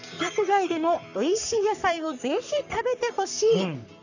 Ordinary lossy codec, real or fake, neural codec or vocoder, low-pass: none; fake; codec, 44.1 kHz, 3.4 kbps, Pupu-Codec; 7.2 kHz